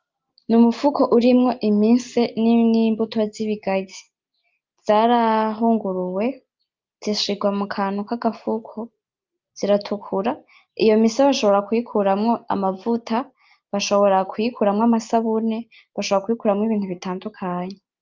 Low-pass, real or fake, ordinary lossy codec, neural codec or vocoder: 7.2 kHz; real; Opus, 24 kbps; none